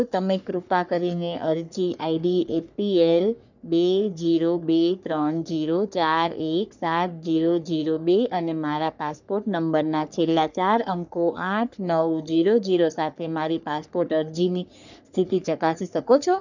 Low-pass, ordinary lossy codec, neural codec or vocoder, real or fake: 7.2 kHz; none; codec, 44.1 kHz, 3.4 kbps, Pupu-Codec; fake